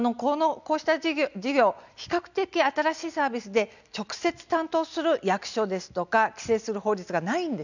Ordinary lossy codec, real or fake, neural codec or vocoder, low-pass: none; real; none; 7.2 kHz